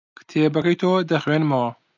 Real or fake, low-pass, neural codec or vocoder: real; 7.2 kHz; none